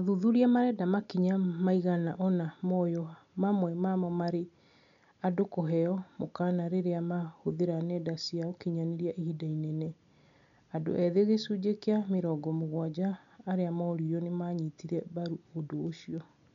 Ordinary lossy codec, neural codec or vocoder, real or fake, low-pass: none; none; real; 7.2 kHz